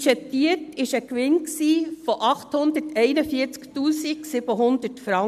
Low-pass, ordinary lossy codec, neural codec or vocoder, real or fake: 14.4 kHz; MP3, 96 kbps; none; real